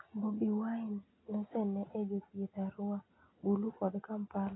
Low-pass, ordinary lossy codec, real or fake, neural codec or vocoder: 7.2 kHz; AAC, 16 kbps; real; none